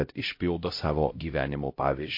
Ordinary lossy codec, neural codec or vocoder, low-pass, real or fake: MP3, 32 kbps; codec, 16 kHz, 0.5 kbps, X-Codec, HuBERT features, trained on LibriSpeech; 5.4 kHz; fake